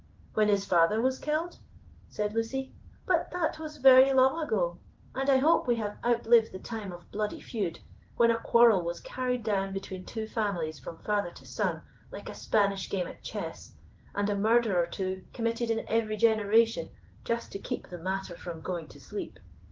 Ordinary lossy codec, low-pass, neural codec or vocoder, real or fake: Opus, 16 kbps; 7.2 kHz; codec, 16 kHz in and 24 kHz out, 1 kbps, XY-Tokenizer; fake